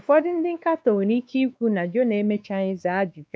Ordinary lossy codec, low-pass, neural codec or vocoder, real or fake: none; none; codec, 16 kHz, 2 kbps, X-Codec, WavLM features, trained on Multilingual LibriSpeech; fake